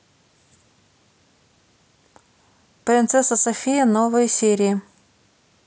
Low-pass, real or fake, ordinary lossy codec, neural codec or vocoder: none; real; none; none